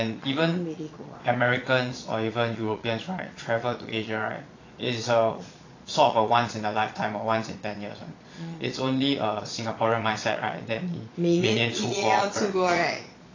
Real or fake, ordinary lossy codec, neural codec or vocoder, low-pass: fake; AAC, 32 kbps; vocoder, 22.05 kHz, 80 mel bands, Vocos; 7.2 kHz